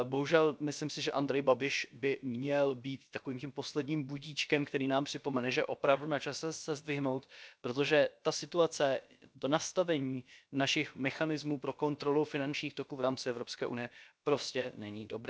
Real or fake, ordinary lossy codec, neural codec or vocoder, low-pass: fake; none; codec, 16 kHz, about 1 kbps, DyCAST, with the encoder's durations; none